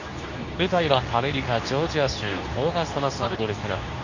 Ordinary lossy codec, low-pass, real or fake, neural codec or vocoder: none; 7.2 kHz; fake; codec, 24 kHz, 0.9 kbps, WavTokenizer, medium speech release version 2